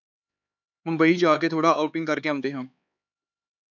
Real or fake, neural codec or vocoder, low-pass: fake; codec, 16 kHz, 4 kbps, X-Codec, HuBERT features, trained on LibriSpeech; 7.2 kHz